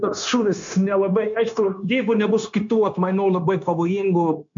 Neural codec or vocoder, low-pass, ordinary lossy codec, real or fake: codec, 16 kHz, 0.9 kbps, LongCat-Audio-Codec; 7.2 kHz; MP3, 64 kbps; fake